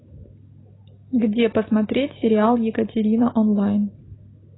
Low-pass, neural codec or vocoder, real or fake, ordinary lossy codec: 7.2 kHz; none; real; AAC, 16 kbps